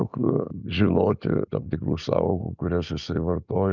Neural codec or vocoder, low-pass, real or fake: none; 7.2 kHz; real